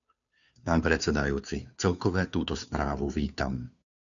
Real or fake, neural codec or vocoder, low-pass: fake; codec, 16 kHz, 2 kbps, FunCodec, trained on Chinese and English, 25 frames a second; 7.2 kHz